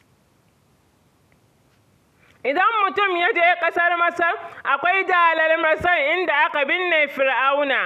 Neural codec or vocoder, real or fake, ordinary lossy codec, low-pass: none; real; none; 14.4 kHz